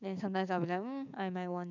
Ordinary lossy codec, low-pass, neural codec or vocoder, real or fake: none; 7.2 kHz; codec, 16 kHz, 6 kbps, DAC; fake